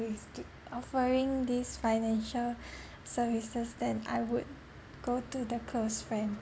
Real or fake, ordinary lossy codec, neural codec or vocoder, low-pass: real; none; none; none